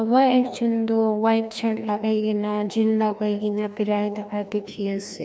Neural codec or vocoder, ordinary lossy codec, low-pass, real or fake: codec, 16 kHz, 1 kbps, FreqCodec, larger model; none; none; fake